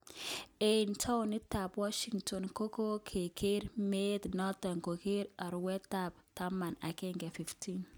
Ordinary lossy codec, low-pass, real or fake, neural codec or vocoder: none; none; real; none